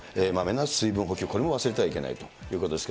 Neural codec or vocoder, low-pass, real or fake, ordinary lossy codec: none; none; real; none